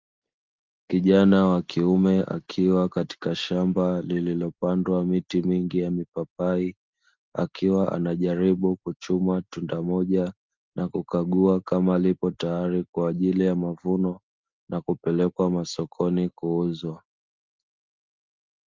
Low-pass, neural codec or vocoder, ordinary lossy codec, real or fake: 7.2 kHz; none; Opus, 32 kbps; real